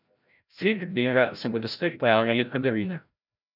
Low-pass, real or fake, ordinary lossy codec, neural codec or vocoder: 5.4 kHz; fake; none; codec, 16 kHz, 0.5 kbps, FreqCodec, larger model